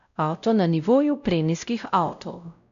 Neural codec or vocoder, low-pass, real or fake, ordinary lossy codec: codec, 16 kHz, 0.5 kbps, X-Codec, WavLM features, trained on Multilingual LibriSpeech; 7.2 kHz; fake; none